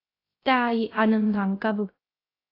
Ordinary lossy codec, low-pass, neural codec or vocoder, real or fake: AAC, 24 kbps; 5.4 kHz; codec, 16 kHz, 0.3 kbps, FocalCodec; fake